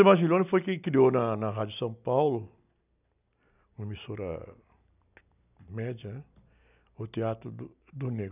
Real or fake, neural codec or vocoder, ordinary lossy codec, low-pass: real; none; none; 3.6 kHz